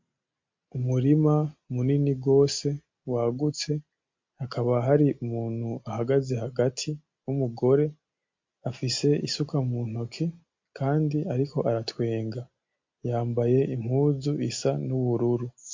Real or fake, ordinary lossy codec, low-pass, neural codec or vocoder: real; MP3, 48 kbps; 7.2 kHz; none